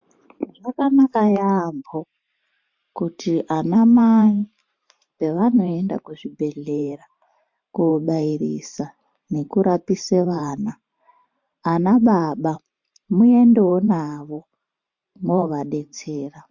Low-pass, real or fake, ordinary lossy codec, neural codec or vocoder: 7.2 kHz; fake; MP3, 48 kbps; vocoder, 44.1 kHz, 128 mel bands every 512 samples, BigVGAN v2